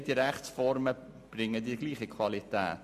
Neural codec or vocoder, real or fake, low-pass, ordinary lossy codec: none; real; 14.4 kHz; MP3, 64 kbps